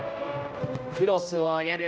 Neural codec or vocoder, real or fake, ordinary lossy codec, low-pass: codec, 16 kHz, 0.5 kbps, X-Codec, HuBERT features, trained on general audio; fake; none; none